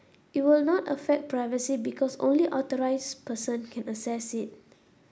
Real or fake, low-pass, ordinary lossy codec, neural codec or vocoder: real; none; none; none